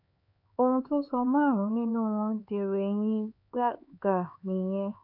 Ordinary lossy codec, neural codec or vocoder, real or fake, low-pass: AAC, 48 kbps; codec, 16 kHz, 4 kbps, X-Codec, HuBERT features, trained on LibriSpeech; fake; 5.4 kHz